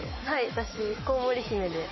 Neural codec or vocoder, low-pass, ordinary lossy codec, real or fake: autoencoder, 48 kHz, 128 numbers a frame, DAC-VAE, trained on Japanese speech; 7.2 kHz; MP3, 24 kbps; fake